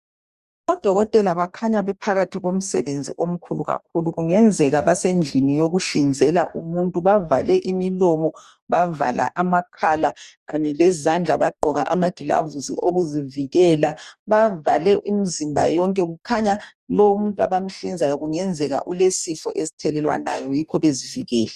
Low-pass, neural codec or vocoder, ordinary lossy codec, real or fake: 14.4 kHz; codec, 44.1 kHz, 2.6 kbps, DAC; Opus, 64 kbps; fake